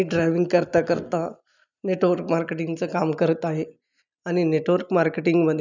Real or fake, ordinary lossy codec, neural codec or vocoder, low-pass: real; none; none; 7.2 kHz